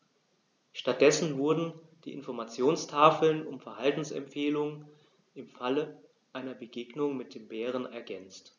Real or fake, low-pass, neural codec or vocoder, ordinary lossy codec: real; 7.2 kHz; none; none